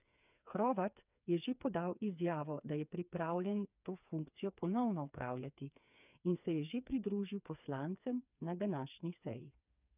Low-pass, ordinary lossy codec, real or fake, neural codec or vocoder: 3.6 kHz; none; fake; codec, 16 kHz, 4 kbps, FreqCodec, smaller model